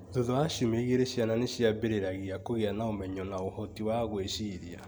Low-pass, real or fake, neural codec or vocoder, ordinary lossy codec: none; real; none; none